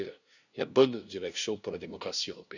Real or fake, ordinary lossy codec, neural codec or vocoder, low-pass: fake; none; codec, 16 kHz, 0.5 kbps, FunCodec, trained on LibriTTS, 25 frames a second; 7.2 kHz